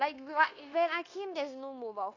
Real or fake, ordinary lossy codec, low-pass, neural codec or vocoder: fake; AAC, 32 kbps; 7.2 kHz; codec, 16 kHz, 0.9 kbps, LongCat-Audio-Codec